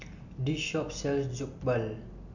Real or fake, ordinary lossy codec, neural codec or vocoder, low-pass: real; none; none; 7.2 kHz